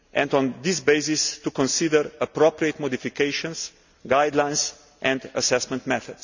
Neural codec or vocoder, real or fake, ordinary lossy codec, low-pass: none; real; none; 7.2 kHz